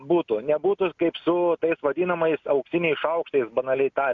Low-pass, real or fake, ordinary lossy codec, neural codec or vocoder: 7.2 kHz; real; MP3, 64 kbps; none